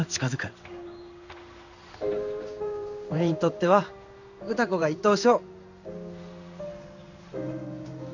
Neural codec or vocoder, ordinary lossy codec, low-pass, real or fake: codec, 16 kHz in and 24 kHz out, 1 kbps, XY-Tokenizer; none; 7.2 kHz; fake